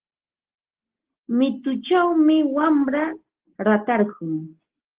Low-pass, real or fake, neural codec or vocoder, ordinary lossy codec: 3.6 kHz; real; none; Opus, 16 kbps